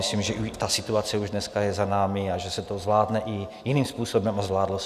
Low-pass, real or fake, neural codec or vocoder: 14.4 kHz; real; none